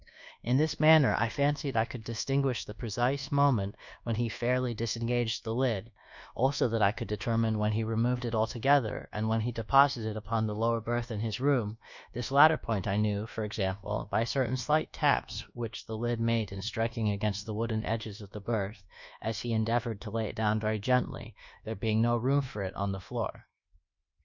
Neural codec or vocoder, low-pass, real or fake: codec, 24 kHz, 1.2 kbps, DualCodec; 7.2 kHz; fake